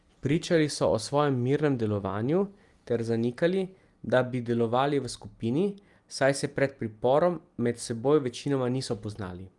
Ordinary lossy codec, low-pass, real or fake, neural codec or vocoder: Opus, 32 kbps; 10.8 kHz; real; none